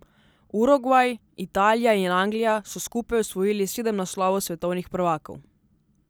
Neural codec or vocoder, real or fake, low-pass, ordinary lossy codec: none; real; none; none